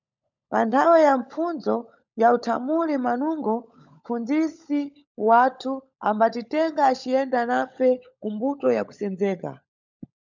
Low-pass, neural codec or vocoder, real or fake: 7.2 kHz; codec, 16 kHz, 16 kbps, FunCodec, trained on LibriTTS, 50 frames a second; fake